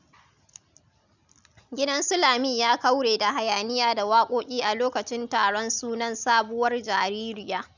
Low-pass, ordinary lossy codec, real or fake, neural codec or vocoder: 7.2 kHz; none; real; none